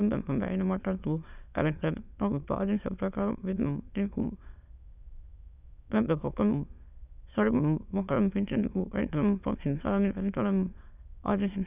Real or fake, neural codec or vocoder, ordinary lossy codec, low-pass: fake; autoencoder, 22.05 kHz, a latent of 192 numbers a frame, VITS, trained on many speakers; none; 3.6 kHz